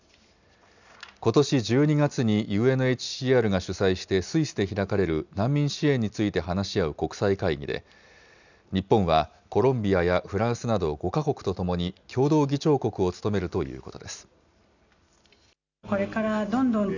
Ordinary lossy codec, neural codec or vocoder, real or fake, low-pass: none; none; real; 7.2 kHz